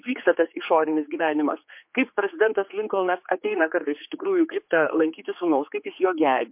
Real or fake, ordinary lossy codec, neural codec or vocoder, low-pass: fake; MP3, 32 kbps; codec, 16 kHz, 4 kbps, X-Codec, HuBERT features, trained on general audio; 3.6 kHz